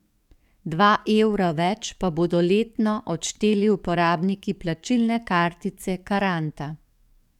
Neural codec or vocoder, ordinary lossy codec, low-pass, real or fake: codec, 44.1 kHz, 7.8 kbps, DAC; none; 19.8 kHz; fake